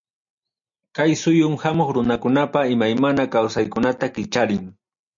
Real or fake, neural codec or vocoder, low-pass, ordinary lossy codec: real; none; 7.2 kHz; MP3, 64 kbps